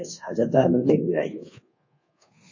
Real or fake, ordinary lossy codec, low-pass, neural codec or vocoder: fake; MP3, 32 kbps; 7.2 kHz; codec, 24 kHz, 1.2 kbps, DualCodec